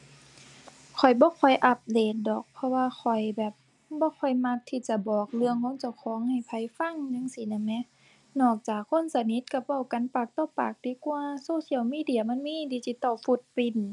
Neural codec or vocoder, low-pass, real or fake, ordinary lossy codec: none; none; real; none